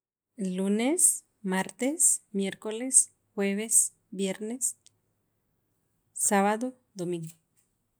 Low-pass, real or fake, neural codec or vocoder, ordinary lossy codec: none; real; none; none